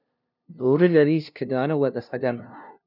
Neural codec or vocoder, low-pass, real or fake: codec, 16 kHz, 0.5 kbps, FunCodec, trained on LibriTTS, 25 frames a second; 5.4 kHz; fake